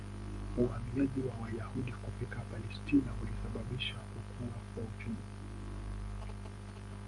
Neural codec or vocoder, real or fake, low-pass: vocoder, 44.1 kHz, 128 mel bands every 512 samples, BigVGAN v2; fake; 10.8 kHz